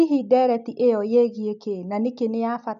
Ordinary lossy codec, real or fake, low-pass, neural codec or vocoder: AAC, 96 kbps; real; 7.2 kHz; none